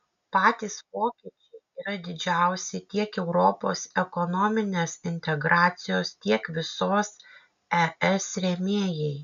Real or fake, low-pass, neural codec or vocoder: real; 7.2 kHz; none